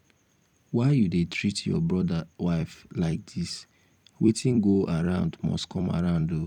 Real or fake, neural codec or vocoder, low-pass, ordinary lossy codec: fake; vocoder, 44.1 kHz, 128 mel bands every 256 samples, BigVGAN v2; 19.8 kHz; none